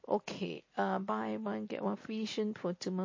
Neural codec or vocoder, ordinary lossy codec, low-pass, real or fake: none; MP3, 32 kbps; 7.2 kHz; real